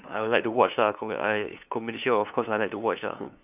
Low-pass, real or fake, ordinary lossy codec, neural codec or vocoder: 3.6 kHz; fake; none; codec, 16 kHz, 2 kbps, FunCodec, trained on LibriTTS, 25 frames a second